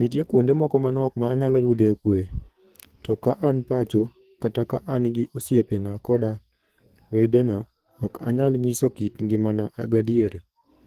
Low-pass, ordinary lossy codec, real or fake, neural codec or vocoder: 14.4 kHz; Opus, 24 kbps; fake; codec, 44.1 kHz, 2.6 kbps, SNAC